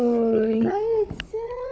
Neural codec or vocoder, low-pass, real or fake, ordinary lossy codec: codec, 16 kHz, 16 kbps, FunCodec, trained on Chinese and English, 50 frames a second; none; fake; none